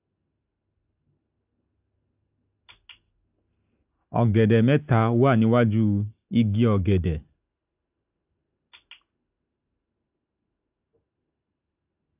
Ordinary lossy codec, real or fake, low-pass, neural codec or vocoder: AAC, 32 kbps; real; 3.6 kHz; none